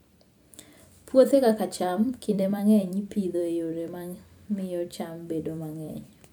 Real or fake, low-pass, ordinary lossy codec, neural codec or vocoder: fake; none; none; vocoder, 44.1 kHz, 128 mel bands every 256 samples, BigVGAN v2